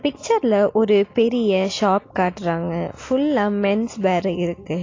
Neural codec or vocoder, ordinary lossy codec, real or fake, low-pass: none; AAC, 32 kbps; real; 7.2 kHz